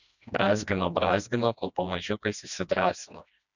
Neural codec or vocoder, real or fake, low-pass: codec, 16 kHz, 1 kbps, FreqCodec, smaller model; fake; 7.2 kHz